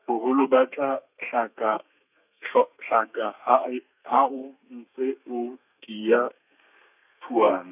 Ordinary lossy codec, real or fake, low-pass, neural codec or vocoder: none; fake; 3.6 kHz; codec, 32 kHz, 1.9 kbps, SNAC